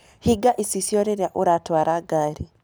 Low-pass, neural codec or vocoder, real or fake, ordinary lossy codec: none; none; real; none